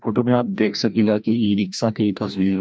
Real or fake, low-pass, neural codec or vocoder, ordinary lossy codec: fake; none; codec, 16 kHz, 1 kbps, FreqCodec, larger model; none